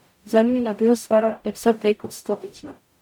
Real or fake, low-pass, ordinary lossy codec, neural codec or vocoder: fake; none; none; codec, 44.1 kHz, 0.9 kbps, DAC